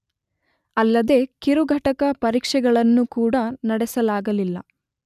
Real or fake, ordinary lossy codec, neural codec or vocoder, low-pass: real; none; none; 14.4 kHz